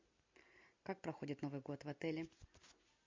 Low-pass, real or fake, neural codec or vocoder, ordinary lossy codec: 7.2 kHz; real; none; MP3, 48 kbps